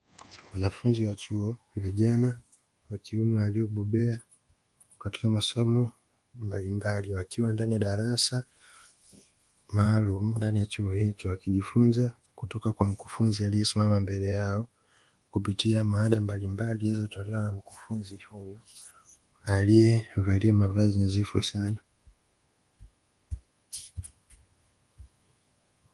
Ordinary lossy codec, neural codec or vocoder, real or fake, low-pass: Opus, 24 kbps; codec, 24 kHz, 1.2 kbps, DualCodec; fake; 10.8 kHz